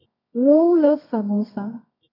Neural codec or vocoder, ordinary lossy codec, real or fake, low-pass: codec, 24 kHz, 0.9 kbps, WavTokenizer, medium music audio release; none; fake; 5.4 kHz